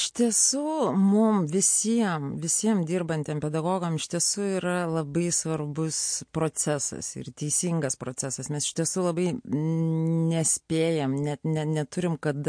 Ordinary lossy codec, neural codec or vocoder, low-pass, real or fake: MP3, 48 kbps; none; 9.9 kHz; real